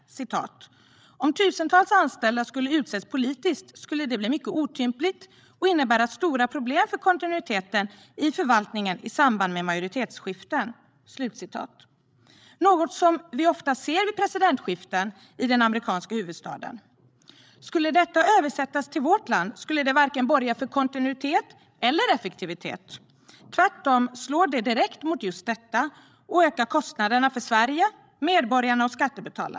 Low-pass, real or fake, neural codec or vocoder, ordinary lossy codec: none; fake; codec, 16 kHz, 16 kbps, FreqCodec, larger model; none